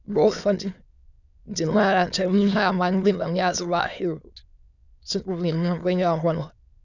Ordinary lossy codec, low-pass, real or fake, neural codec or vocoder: none; 7.2 kHz; fake; autoencoder, 22.05 kHz, a latent of 192 numbers a frame, VITS, trained on many speakers